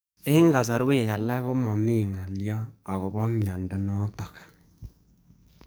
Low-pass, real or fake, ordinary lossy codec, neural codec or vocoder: none; fake; none; codec, 44.1 kHz, 2.6 kbps, SNAC